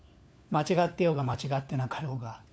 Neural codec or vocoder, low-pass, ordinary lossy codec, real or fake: codec, 16 kHz, 4 kbps, FunCodec, trained on LibriTTS, 50 frames a second; none; none; fake